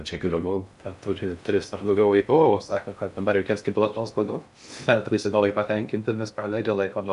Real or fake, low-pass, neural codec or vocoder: fake; 10.8 kHz; codec, 16 kHz in and 24 kHz out, 0.6 kbps, FocalCodec, streaming, 4096 codes